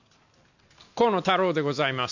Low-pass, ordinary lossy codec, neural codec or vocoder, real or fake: 7.2 kHz; none; none; real